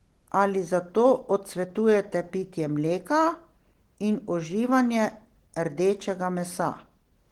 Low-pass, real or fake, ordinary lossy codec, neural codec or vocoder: 19.8 kHz; real; Opus, 16 kbps; none